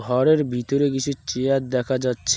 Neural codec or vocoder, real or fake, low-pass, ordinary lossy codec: none; real; none; none